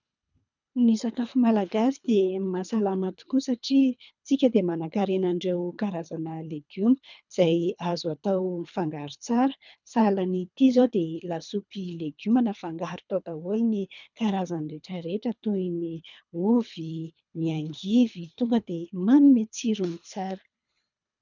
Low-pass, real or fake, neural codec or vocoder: 7.2 kHz; fake; codec, 24 kHz, 3 kbps, HILCodec